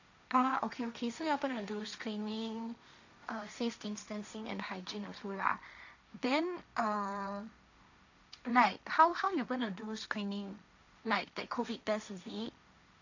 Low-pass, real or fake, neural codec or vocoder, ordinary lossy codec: 7.2 kHz; fake; codec, 16 kHz, 1.1 kbps, Voila-Tokenizer; none